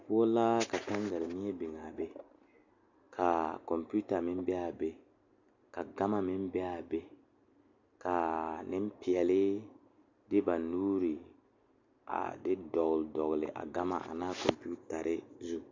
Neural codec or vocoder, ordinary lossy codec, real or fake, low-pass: none; AAC, 48 kbps; real; 7.2 kHz